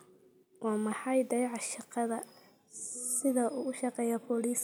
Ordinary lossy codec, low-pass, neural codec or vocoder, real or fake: none; none; none; real